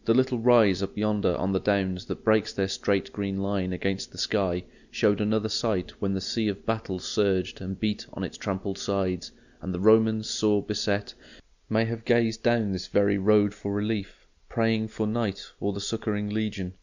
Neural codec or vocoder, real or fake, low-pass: none; real; 7.2 kHz